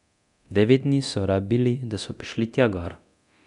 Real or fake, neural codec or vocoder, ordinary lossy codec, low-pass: fake; codec, 24 kHz, 0.9 kbps, DualCodec; none; 10.8 kHz